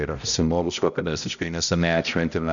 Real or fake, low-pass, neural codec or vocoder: fake; 7.2 kHz; codec, 16 kHz, 0.5 kbps, X-Codec, HuBERT features, trained on balanced general audio